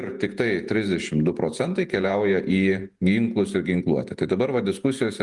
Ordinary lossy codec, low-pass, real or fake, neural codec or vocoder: Opus, 32 kbps; 10.8 kHz; fake; vocoder, 48 kHz, 128 mel bands, Vocos